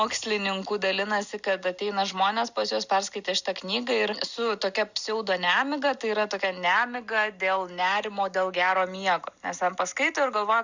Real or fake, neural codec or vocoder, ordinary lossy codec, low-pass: real; none; Opus, 64 kbps; 7.2 kHz